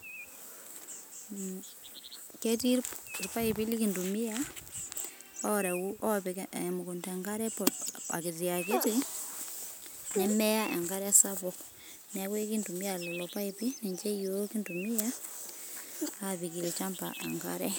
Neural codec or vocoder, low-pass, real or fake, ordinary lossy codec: none; none; real; none